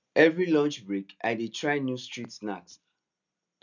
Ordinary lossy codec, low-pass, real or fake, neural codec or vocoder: none; 7.2 kHz; real; none